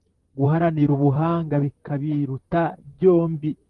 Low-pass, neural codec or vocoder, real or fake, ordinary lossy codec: 10.8 kHz; vocoder, 44.1 kHz, 128 mel bands, Pupu-Vocoder; fake; Opus, 24 kbps